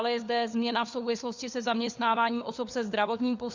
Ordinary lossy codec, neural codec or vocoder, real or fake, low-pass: Opus, 64 kbps; codec, 16 kHz, 4 kbps, FunCodec, trained on LibriTTS, 50 frames a second; fake; 7.2 kHz